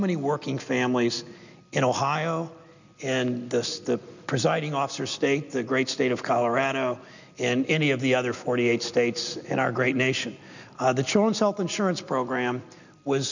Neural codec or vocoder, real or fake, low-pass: none; real; 7.2 kHz